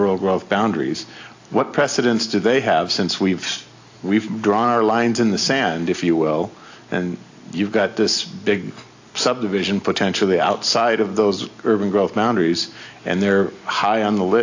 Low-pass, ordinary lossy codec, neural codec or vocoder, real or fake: 7.2 kHz; AAC, 48 kbps; none; real